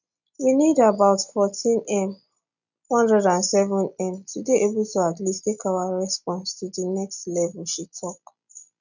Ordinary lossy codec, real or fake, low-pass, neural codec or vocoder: none; real; 7.2 kHz; none